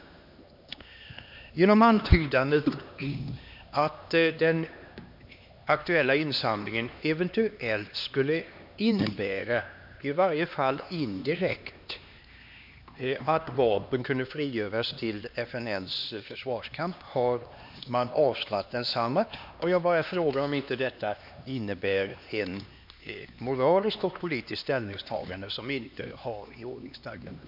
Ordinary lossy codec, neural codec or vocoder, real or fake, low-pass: MP3, 48 kbps; codec, 16 kHz, 2 kbps, X-Codec, HuBERT features, trained on LibriSpeech; fake; 5.4 kHz